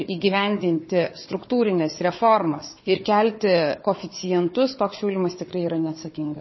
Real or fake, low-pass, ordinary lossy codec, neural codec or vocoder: fake; 7.2 kHz; MP3, 24 kbps; codec, 16 kHz, 4 kbps, FunCodec, trained on Chinese and English, 50 frames a second